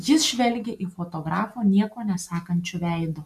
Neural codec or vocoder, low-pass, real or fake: none; 14.4 kHz; real